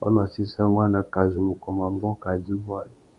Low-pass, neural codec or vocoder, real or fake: 10.8 kHz; codec, 24 kHz, 0.9 kbps, WavTokenizer, medium speech release version 2; fake